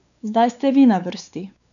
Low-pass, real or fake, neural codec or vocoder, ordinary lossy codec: 7.2 kHz; fake; codec, 16 kHz, 4 kbps, X-Codec, WavLM features, trained on Multilingual LibriSpeech; none